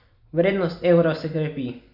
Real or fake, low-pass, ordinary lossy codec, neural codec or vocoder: real; 5.4 kHz; none; none